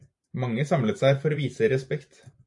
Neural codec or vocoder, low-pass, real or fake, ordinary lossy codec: vocoder, 44.1 kHz, 128 mel bands every 512 samples, BigVGAN v2; 10.8 kHz; fake; AAC, 64 kbps